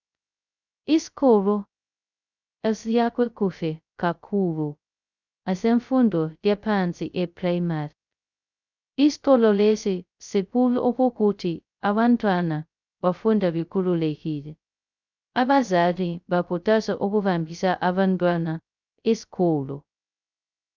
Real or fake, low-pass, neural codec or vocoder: fake; 7.2 kHz; codec, 16 kHz, 0.2 kbps, FocalCodec